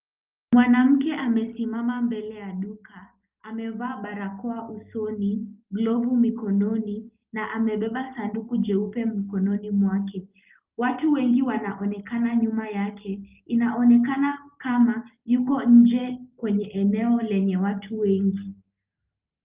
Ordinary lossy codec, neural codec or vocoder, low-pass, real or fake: Opus, 24 kbps; none; 3.6 kHz; real